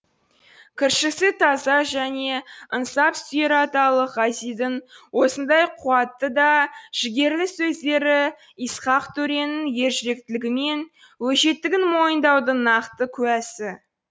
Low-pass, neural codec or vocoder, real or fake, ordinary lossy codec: none; none; real; none